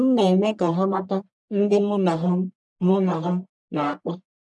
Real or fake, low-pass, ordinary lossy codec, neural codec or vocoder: fake; 10.8 kHz; none; codec, 44.1 kHz, 1.7 kbps, Pupu-Codec